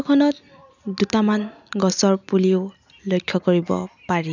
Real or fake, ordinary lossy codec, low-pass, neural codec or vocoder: real; none; 7.2 kHz; none